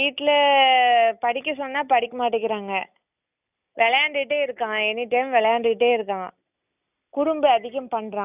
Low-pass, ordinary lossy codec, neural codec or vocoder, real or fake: 3.6 kHz; none; none; real